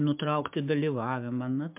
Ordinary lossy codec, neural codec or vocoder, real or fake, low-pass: MP3, 32 kbps; codec, 16 kHz, 6 kbps, DAC; fake; 3.6 kHz